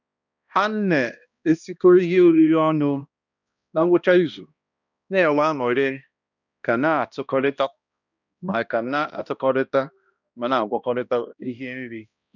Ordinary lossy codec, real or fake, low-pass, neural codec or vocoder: none; fake; 7.2 kHz; codec, 16 kHz, 1 kbps, X-Codec, HuBERT features, trained on balanced general audio